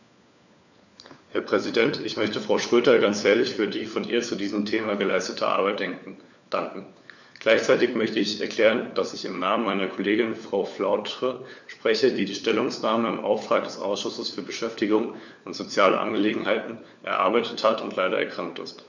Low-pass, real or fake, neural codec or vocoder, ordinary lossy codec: 7.2 kHz; fake; codec, 16 kHz, 4 kbps, FunCodec, trained on LibriTTS, 50 frames a second; none